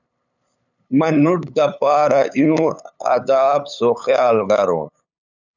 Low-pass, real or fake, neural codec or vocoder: 7.2 kHz; fake; codec, 16 kHz, 8 kbps, FunCodec, trained on LibriTTS, 25 frames a second